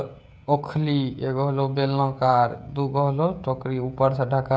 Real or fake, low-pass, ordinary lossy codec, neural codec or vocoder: fake; none; none; codec, 16 kHz, 16 kbps, FreqCodec, smaller model